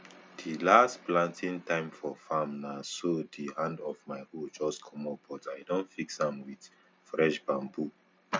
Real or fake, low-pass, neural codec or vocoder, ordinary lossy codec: real; none; none; none